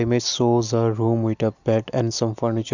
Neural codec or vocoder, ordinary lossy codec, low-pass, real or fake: none; none; 7.2 kHz; real